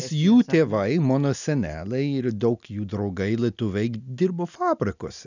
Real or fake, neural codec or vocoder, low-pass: real; none; 7.2 kHz